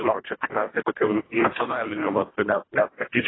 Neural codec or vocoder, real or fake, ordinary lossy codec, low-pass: codec, 24 kHz, 1.5 kbps, HILCodec; fake; AAC, 16 kbps; 7.2 kHz